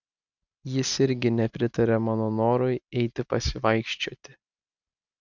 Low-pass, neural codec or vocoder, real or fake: 7.2 kHz; none; real